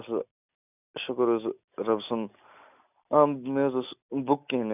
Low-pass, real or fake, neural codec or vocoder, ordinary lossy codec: 3.6 kHz; real; none; none